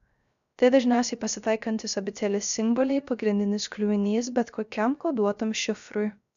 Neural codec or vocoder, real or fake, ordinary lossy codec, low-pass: codec, 16 kHz, 0.3 kbps, FocalCodec; fake; MP3, 96 kbps; 7.2 kHz